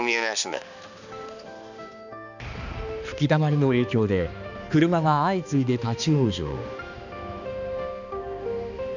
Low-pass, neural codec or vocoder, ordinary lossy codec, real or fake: 7.2 kHz; codec, 16 kHz, 2 kbps, X-Codec, HuBERT features, trained on balanced general audio; none; fake